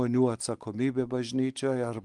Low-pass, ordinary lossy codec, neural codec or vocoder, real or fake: 10.8 kHz; Opus, 24 kbps; none; real